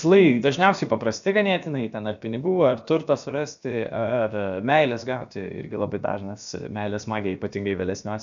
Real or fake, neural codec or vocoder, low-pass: fake; codec, 16 kHz, about 1 kbps, DyCAST, with the encoder's durations; 7.2 kHz